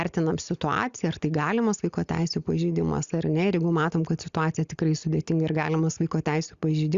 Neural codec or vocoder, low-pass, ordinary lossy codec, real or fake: none; 7.2 kHz; Opus, 64 kbps; real